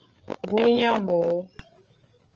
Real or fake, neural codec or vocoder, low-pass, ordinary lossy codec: fake; codec, 16 kHz, 8 kbps, FreqCodec, larger model; 7.2 kHz; Opus, 24 kbps